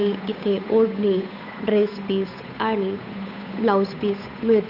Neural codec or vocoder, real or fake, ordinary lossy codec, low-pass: codec, 16 kHz, 8 kbps, FunCodec, trained on Chinese and English, 25 frames a second; fake; none; 5.4 kHz